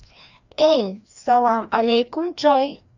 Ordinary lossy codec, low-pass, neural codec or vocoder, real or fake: none; 7.2 kHz; codec, 16 kHz, 1 kbps, FreqCodec, larger model; fake